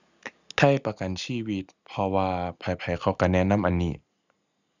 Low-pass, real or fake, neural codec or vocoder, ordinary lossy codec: 7.2 kHz; real; none; none